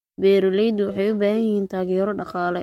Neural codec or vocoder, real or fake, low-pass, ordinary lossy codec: codec, 44.1 kHz, 7.8 kbps, Pupu-Codec; fake; 19.8 kHz; MP3, 64 kbps